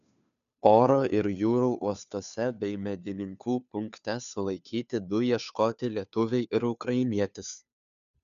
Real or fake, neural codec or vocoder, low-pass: fake; codec, 16 kHz, 2 kbps, FunCodec, trained on Chinese and English, 25 frames a second; 7.2 kHz